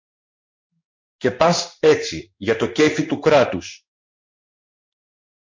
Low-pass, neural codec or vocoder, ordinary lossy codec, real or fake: 7.2 kHz; codec, 16 kHz in and 24 kHz out, 1 kbps, XY-Tokenizer; MP3, 48 kbps; fake